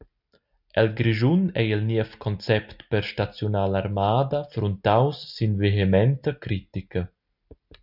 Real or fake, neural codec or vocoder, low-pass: real; none; 5.4 kHz